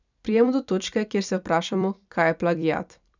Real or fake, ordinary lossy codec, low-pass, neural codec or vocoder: fake; none; 7.2 kHz; vocoder, 44.1 kHz, 128 mel bands every 256 samples, BigVGAN v2